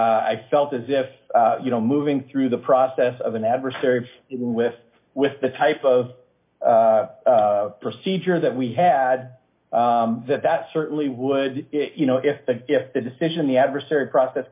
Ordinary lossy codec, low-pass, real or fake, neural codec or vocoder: MP3, 24 kbps; 3.6 kHz; real; none